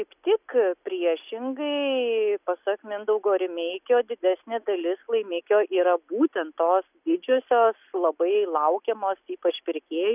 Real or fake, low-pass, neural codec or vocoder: real; 3.6 kHz; none